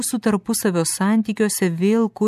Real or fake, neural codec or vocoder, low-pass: real; none; 14.4 kHz